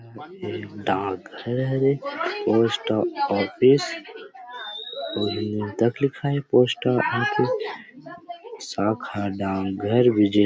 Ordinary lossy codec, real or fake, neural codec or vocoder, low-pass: none; real; none; none